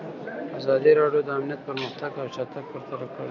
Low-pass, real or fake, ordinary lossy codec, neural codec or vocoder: 7.2 kHz; real; MP3, 64 kbps; none